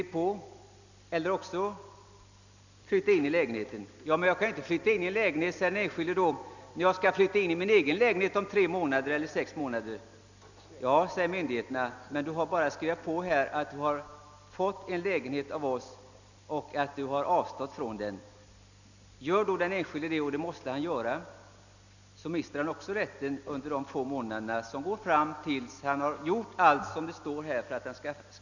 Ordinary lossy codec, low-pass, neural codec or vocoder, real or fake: none; 7.2 kHz; none; real